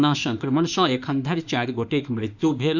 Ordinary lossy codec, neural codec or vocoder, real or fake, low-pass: none; autoencoder, 48 kHz, 32 numbers a frame, DAC-VAE, trained on Japanese speech; fake; 7.2 kHz